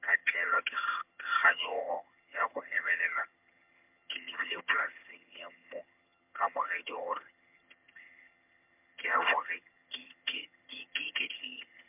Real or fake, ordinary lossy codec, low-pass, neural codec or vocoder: fake; MP3, 32 kbps; 3.6 kHz; vocoder, 22.05 kHz, 80 mel bands, HiFi-GAN